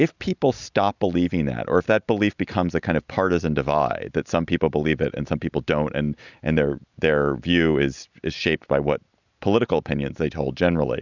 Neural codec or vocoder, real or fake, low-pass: none; real; 7.2 kHz